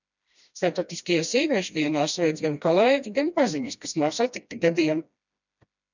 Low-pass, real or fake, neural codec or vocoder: 7.2 kHz; fake; codec, 16 kHz, 1 kbps, FreqCodec, smaller model